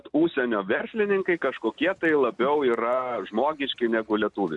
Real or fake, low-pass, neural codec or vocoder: real; 10.8 kHz; none